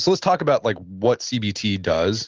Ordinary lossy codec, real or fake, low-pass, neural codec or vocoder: Opus, 16 kbps; real; 7.2 kHz; none